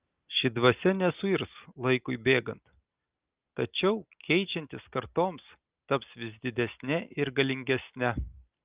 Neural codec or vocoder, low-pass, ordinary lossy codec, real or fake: none; 3.6 kHz; Opus, 32 kbps; real